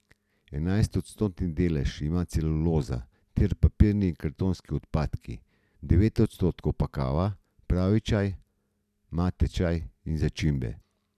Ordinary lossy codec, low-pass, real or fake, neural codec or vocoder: none; 14.4 kHz; real; none